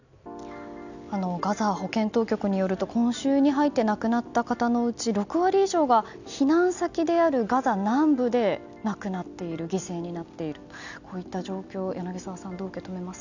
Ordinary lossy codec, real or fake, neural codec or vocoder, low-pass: none; real; none; 7.2 kHz